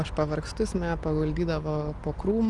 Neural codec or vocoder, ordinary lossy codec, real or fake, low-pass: none; Opus, 24 kbps; real; 10.8 kHz